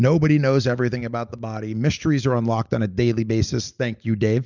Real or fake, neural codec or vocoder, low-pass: real; none; 7.2 kHz